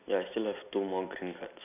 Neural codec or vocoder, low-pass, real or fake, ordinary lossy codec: none; 3.6 kHz; real; none